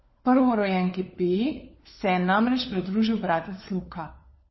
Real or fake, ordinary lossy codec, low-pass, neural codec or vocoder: fake; MP3, 24 kbps; 7.2 kHz; codec, 16 kHz, 4 kbps, FunCodec, trained on LibriTTS, 50 frames a second